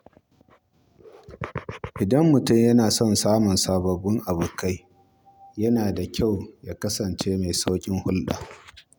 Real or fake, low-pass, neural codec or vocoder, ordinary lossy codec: real; none; none; none